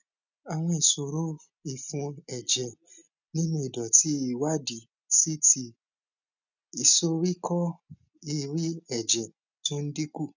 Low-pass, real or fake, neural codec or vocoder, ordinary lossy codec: 7.2 kHz; real; none; none